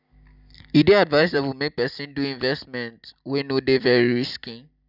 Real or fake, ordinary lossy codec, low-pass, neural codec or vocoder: fake; none; 5.4 kHz; vocoder, 44.1 kHz, 128 mel bands every 256 samples, BigVGAN v2